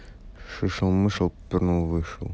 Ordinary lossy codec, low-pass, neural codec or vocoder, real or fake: none; none; none; real